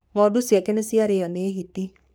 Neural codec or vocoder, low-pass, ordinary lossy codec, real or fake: codec, 44.1 kHz, 3.4 kbps, Pupu-Codec; none; none; fake